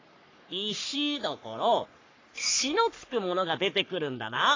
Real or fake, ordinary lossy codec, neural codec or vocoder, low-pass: fake; AAC, 32 kbps; codec, 44.1 kHz, 3.4 kbps, Pupu-Codec; 7.2 kHz